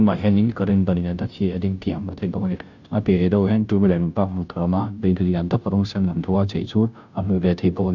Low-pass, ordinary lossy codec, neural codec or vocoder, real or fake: 7.2 kHz; none; codec, 16 kHz, 0.5 kbps, FunCodec, trained on Chinese and English, 25 frames a second; fake